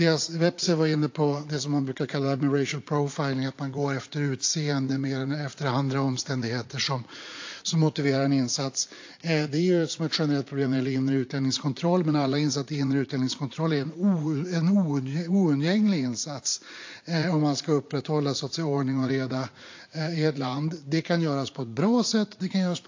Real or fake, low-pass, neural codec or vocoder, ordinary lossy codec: fake; 7.2 kHz; vocoder, 44.1 kHz, 80 mel bands, Vocos; AAC, 48 kbps